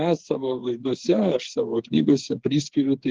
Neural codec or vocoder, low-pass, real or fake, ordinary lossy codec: codec, 16 kHz, 4 kbps, FreqCodec, smaller model; 7.2 kHz; fake; Opus, 16 kbps